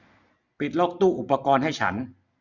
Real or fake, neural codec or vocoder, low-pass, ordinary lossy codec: real; none; 7.2 kHz; none